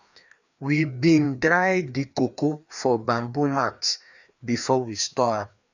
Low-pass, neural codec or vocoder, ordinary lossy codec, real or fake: 7.2 kHz; codec, 16 kHz, 2 kbps, FreqCodec, larger model; none; fake